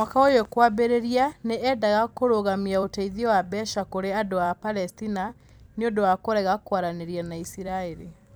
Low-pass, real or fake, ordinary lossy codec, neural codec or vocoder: none; fake; none; vocoder, 44.1 kHz, 128 mel bands every 256 samples, BigVGAN v2